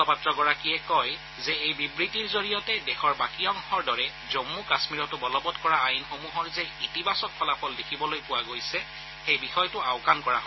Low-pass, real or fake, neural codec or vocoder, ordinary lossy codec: 7.2 kHz; real; none; MP3, 24 kbps